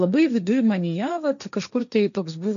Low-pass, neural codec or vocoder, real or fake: 7.2 kHz; codec, 16 kHz, 1.1 kbps, Voila-Tokenizer; fake